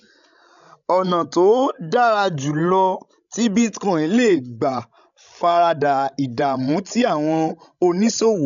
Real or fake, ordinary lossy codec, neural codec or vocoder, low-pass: fake; none; codec, 16 kHz, 16 kbps, FreqCodec, larger model; 7.2 kHz